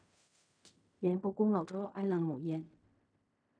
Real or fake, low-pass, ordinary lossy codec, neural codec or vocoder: fake; 9.9 kHz; none; codec, 16 kHz in and 24 kHz out, 0.4 kbps, LongCat-Audio-Codec, fine tuned four codebook decoder